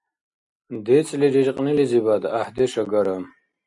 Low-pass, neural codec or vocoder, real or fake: 10.8 kHz; none; real